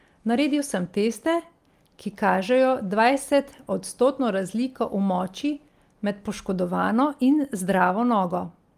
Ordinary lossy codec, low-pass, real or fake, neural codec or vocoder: Opus, 32 kbps; 14.4 kHz; real; none